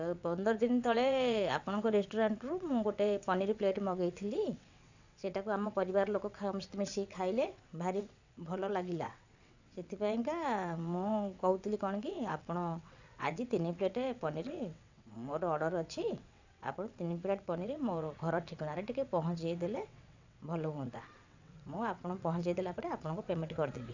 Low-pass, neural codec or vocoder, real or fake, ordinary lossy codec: 7.2 kHz; vocoder, 22.05 kHz, 80 mel bands, WaveNeXt; fake; none